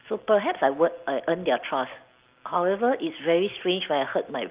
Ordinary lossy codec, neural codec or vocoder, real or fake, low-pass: Opus, 32 kbps; none; real; 3.6 kHz